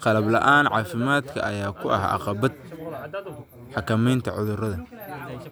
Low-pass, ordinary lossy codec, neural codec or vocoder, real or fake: none; none; none; real